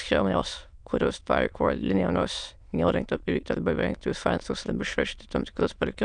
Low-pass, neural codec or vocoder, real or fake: 9.9 kHz; autoencoder, 22.05 kHz, a latent of 192 numbers a frame, VITS, trained on many speakers; fake